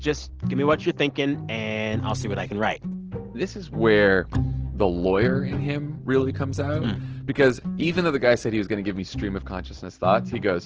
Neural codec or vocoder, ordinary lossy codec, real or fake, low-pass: none; Opus, 16 kbps; real; 7.2 kHz